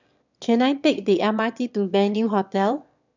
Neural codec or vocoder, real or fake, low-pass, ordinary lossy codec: autoencoder, 22.05 kHz, a latent of 192 numbers a frame, VITS, trained on one speaker; fake; 7.2 kHz; none